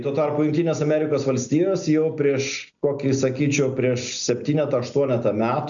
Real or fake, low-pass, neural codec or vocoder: real; 7.2 kHz; none